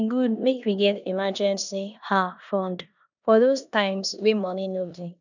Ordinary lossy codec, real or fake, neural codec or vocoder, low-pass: none; fake; codec, 16 kHz in and 24 kHz out, 0.9 kbps, LongCat-Audio-Codec, four codebook decoder; 7.2 kHz